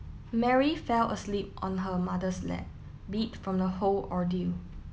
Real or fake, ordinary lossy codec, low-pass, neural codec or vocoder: real; none; none; none